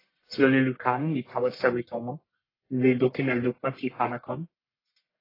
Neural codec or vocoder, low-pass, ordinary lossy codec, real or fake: codec, 44.1 kHz, 1.7 kbps, Pupu-Codec; 5.4 kHz; AAC, 24 kbps; fake